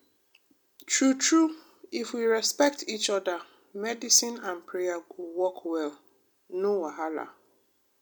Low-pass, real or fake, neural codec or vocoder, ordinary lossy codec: none; real; none; none